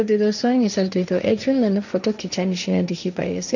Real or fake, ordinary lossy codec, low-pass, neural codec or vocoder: fake; none; 7.2 kHz; codec, 16 kHz, 1.1 kbps, Voila-Tokenizer